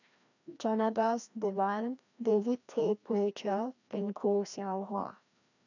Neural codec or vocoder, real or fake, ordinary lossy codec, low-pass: codec, 16 kHz, 1 kbps, FreqCodec, larger model; fake; none; 7.2 kHz